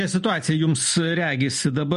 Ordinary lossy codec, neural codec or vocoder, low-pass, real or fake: MP3, 48 kbps; none; 14.4 kHz; real